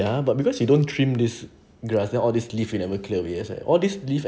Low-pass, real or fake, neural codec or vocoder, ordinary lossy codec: none; real; none; none